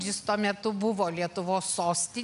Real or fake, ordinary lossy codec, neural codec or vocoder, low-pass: real; MP3, 96 kbps; none; 10.8 kHz